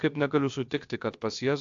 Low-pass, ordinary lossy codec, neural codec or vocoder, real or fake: 7.2 kHz; AAC, 64 kbps; codec, 16 kHz, about 1 kbps, DyCAST, with the encoder's durations; fake